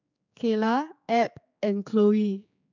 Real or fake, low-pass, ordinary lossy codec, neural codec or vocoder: fake; 7.2 kHz; none; codec, 16 kHz, 4 kbps, X-Codec, HuBERT features, trained on general audio